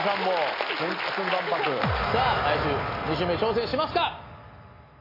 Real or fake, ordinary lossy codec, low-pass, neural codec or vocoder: real; AAC, 24 kbps; 5.4 kHz; none